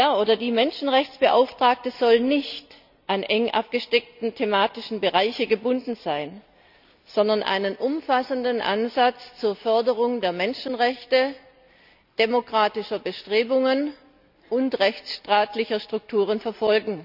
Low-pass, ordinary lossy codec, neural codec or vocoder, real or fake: 5.4 kHz; none; none; real